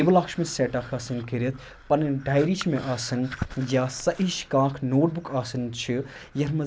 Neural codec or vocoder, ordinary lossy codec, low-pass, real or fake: none; none; none; real